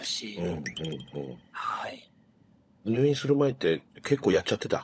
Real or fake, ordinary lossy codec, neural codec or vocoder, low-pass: fake; none; codec, 16 kHz, 16 kbps, FunCodec, trained on LibriTTS, 50 frames a second; none